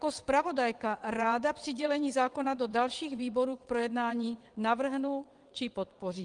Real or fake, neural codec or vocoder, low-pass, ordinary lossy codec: fake; vocoder, 22.05 kHz, 80 mel bands, WaveNeXt; 9.9 kHz; Opus, 24 kbps